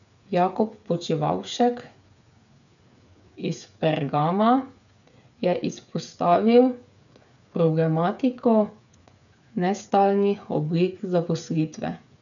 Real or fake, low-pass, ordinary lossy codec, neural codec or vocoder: fake; 7.2 kHz; none; codec, 16 kHz, 8 kbps, FreqCodec, smaller model